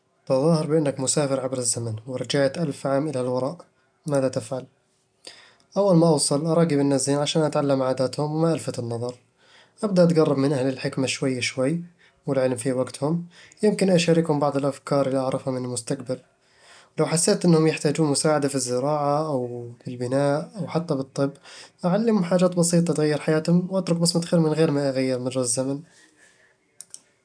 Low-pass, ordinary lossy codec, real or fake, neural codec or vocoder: 9.9 kHz; none; real; none